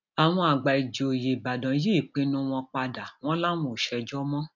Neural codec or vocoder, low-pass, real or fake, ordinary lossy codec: none; 7.2 kHz; real; none